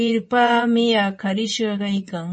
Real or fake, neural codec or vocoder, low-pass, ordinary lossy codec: fake; vocoder, 24 kHz, 100 mel bands, Vocos; 10.8 kHz; MP3, 32 kbps